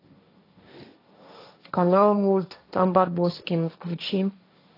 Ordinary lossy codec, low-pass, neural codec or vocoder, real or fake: AAC, 32 kbps; 5.4 kHz; codec, 16 kHz, 1.1 kbps, Voila-Tokenizer; fake